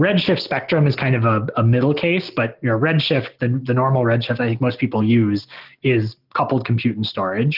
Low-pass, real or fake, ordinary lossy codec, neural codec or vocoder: 5.4 kHz; real; Opus, 32 kbps; none